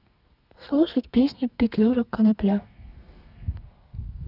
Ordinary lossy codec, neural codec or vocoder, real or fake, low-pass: none; codec, 32 kHz, 1.9 kbps, SNAC; fake; 5.4 kHz